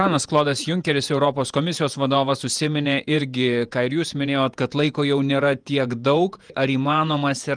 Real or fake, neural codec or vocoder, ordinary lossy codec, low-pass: fake; vocoder, 48 kHz, 128 mel bands, Vocos; Opus, 32 kbps; 9.9 kHz